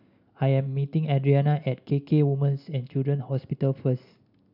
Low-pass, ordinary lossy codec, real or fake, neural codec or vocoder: 5.4 kHz; none; real; none